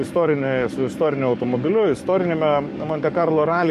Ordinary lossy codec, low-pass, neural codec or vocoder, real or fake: MP3, 64 kbps; 14.4 kHz; codec, 44.1 kHz, 7.8 kbps, DAC; fake